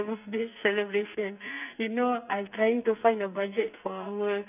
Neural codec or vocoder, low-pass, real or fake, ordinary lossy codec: codec, 44.1 kHz, 2.6 kbps, SNAC; 3.6 kHz; fake; none